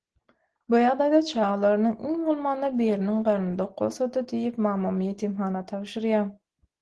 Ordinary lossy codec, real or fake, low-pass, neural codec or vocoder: Opus, 16 kbps; real; 10.8 kHz; none